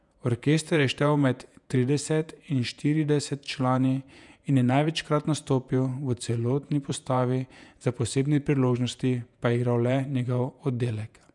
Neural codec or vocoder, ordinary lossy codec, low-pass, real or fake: vocoder, 48 kHz, 128 mel bands, Vocos; none; 10.8 kHz; fake